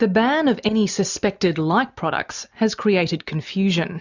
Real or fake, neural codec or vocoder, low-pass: real; none; 7.2 kHz